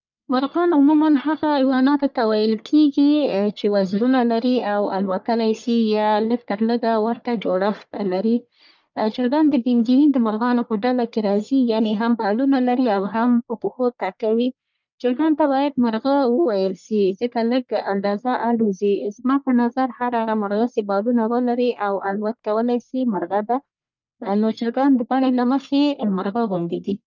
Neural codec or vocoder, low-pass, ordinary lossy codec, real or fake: codec, 44.1 kHz, 1.7 kbps, Pupu-Codec; 7.2 kHz; none; fake